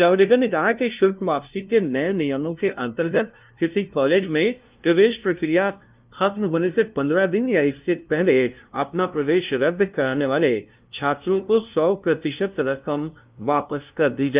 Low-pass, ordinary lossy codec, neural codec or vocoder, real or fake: 3.6 kHz; Opus, 24 kbps; codec, 16 kHz, 0.5 kbps, FunCodec, trained on LibriTTS, 25 frames a second; fake